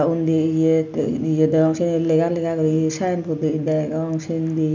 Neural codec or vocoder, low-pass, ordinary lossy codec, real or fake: none; 7.2 kHz; none; real